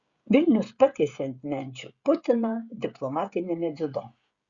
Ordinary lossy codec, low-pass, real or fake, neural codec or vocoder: Opus, 64 kbps; 7.2 kHz; fake; codec, 16 kHz, 16 kbps, FreqCodec, smaller model